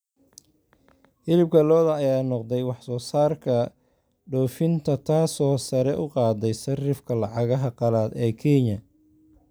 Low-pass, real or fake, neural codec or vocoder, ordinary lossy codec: none; real; none; none